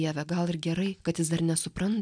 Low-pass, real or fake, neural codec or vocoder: 9.9 kHz; real; none